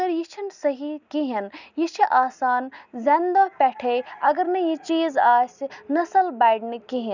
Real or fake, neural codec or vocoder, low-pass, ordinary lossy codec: real; none; 7.2 kHz; none